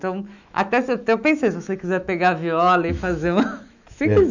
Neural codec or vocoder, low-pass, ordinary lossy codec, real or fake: autoencoder, 48 kHz, 128 numbers a frame, DAC-VAE, trained on Japanese speech; 7.2 kHz; none; fake